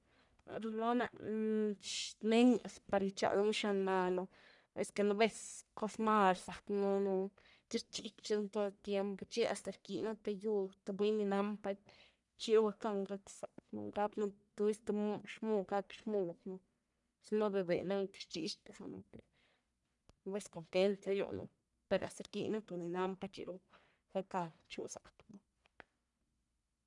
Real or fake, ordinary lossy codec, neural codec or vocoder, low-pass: fake; none; codec, 44.1 kHz, 1.7 kbps, Pupu-Codec; 10.8 kHz